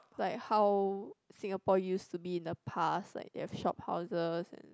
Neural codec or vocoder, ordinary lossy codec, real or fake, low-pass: none; none; real; none